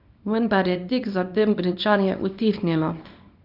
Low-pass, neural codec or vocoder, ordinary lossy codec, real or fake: 5.4 kHz; codec, 24 kHz, 0.9 kbps, WavTokenizer, small release; none; fake